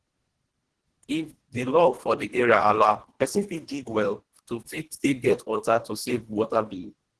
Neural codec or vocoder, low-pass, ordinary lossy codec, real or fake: codec, 24 kHz, 1.5 kbps, HILCodec; 10.8 kHz; Opus, 16 kbps; fake